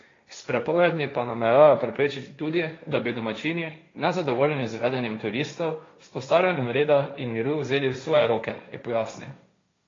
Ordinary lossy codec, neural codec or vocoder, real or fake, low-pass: AAC, 32 kbps; codec, 16 kHz, 1.1 kbps, Voila-Tokenizer; fake; 7.2 kHz